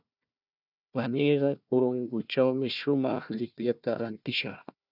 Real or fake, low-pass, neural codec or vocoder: fake; 5.4 kHz; codec, 16 kHz, 1 kbps, FunCodec, trained on Chinese and English, 50 frames a second